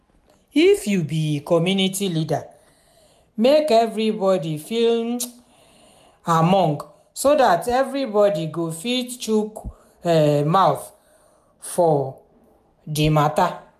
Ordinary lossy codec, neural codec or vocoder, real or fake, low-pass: MP3, 96 kbps; none; real; 14.4 kHz